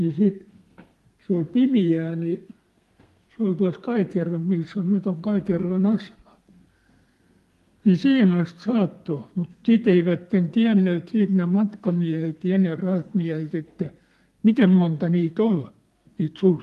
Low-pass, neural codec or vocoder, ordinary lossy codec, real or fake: 14.4 kHz; codec, 32 kHz, 1.9 kbps, SNAC; Opus, 32 kbps; fake